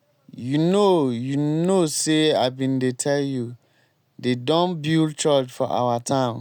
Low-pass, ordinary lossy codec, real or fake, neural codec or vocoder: 19.8 kHz; none; real; none